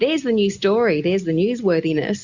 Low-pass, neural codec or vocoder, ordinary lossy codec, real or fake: 7.2 kHz; none; Opus, 64 kbps; real